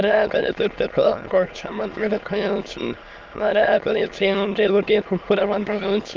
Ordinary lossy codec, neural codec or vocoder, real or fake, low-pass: Opus, 24 kbps; autoencoder, 22.05 kHz, a latent of 192 numbers a frame, VITS, trained on many speakers; fake; 7.2 kHz